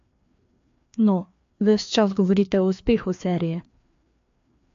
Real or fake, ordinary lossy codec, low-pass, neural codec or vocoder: fake; AAC, 64 kbps; 7.2 kHz; codec, 16 kHz, 2 kbps, FreqCodec, larger model